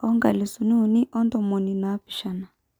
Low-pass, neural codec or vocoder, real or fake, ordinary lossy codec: 19.8 kHz; none; real; none